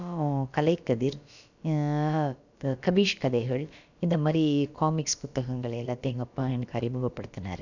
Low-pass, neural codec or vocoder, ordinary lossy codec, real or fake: 7.2 kHz; codec, 16 kHz, about 1 kbps, DyCAST, with the encoder's durations; none; fake